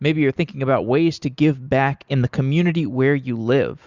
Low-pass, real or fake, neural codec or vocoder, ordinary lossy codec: 7.2 kHz; real; none; Opus, 64 kbps